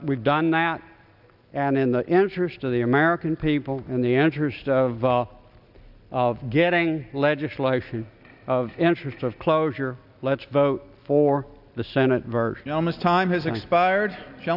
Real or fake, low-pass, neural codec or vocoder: real; 5.4 kHz; none